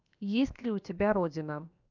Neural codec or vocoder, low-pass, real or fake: codec, 16 kHz, 0.7 kbps, FocalCodec; 7.2 kHz; fake